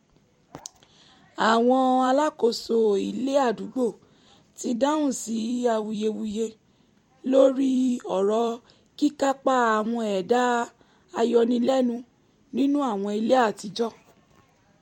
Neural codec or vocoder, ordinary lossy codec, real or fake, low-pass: vocoder, 44.1 kHz, 128 mel bands every 256 samples, BigVGAN v2; MP3, 64 kbps; fake; 19.8 kHz